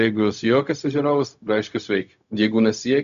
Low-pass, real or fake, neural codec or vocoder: 7.2 kHz; fake; codec, 16 kHz, 0.4 kbps, LongCat-Audio-Codec